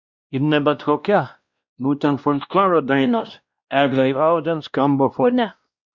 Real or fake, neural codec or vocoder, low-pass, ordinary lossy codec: fake; codec, 16 kHz, 1 kbps, X-Codec, WavLM features, trained on Multilingual LibriSpeech; 7.2 kHz; Opus, 64 kbps